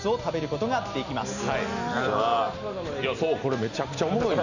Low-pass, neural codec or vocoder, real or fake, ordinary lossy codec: 7.2 kHz; none; real; none